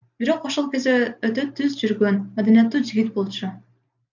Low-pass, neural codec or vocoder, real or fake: 7.2 kHz; none; real